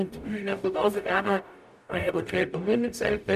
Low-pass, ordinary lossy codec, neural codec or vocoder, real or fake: 14.4 kHz; none; codec, 44.1 kHz, 0.9 kbps, DAC; fake